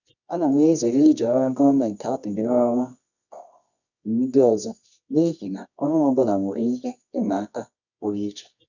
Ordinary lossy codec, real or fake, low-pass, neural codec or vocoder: none; fake; 7.2 kHz; codec, 24 kHz, 0.9 kbps, WavTokenizer, medium music audio release